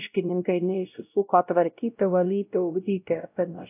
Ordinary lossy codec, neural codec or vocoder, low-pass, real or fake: AAC, 32 kbps; codec, 16 kHz, 0.5 kbps, X-Codec, WavLM features, trained on Multilingual LibriSpeech; 3.6 kHz; fake